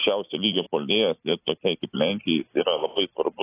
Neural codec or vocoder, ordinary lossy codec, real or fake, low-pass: none; AAC, 16 kbps; real; 3.6 kHz